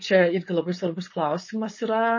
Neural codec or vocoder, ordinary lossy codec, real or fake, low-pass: codec, 16 kHz, 4.8 kbps, FACodec; MP3, 32 kbps; fake; 7.2 kHz